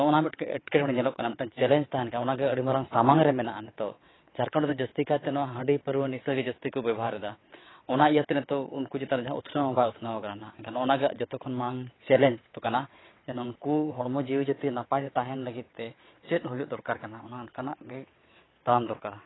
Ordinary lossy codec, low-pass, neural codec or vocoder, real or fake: AAC, 16 kbps; 7.2 kHz; vocoder, 22.05 kHz, 80 mel bands, WaveNeXt; fake